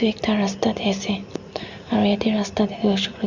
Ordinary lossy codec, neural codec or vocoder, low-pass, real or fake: Opus, 64 kbps; none; 7.2 kHz; real